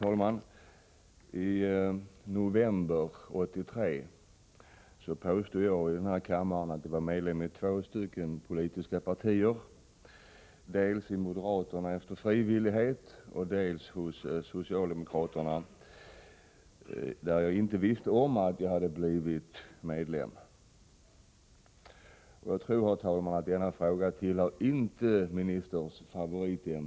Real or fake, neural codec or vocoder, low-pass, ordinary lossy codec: real; none; none; none